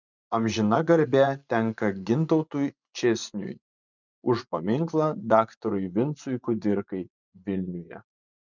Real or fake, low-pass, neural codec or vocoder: fake; 7.2 kHz; vocoder, 24 kHz, 100 mel bands, Vocos